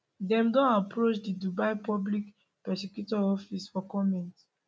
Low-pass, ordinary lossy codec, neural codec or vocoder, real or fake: none; none; none; real